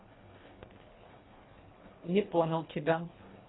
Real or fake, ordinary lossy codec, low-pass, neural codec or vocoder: fake; AAC, 16 kbps; 7.2 kHz; codec, 24 kHz, 1.5 kbps, HILCodec